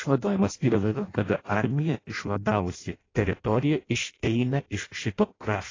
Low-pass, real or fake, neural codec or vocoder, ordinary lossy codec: 7.2 kHz; fake; codec, 16 kHz in and 24 kHz out, 0.6 kbps, FireRedTTS-2 codec; AAC, 32 kbps